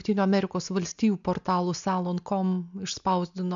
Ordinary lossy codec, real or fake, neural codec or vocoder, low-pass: MP3, 64 kbps; real; none; 7.2 kHz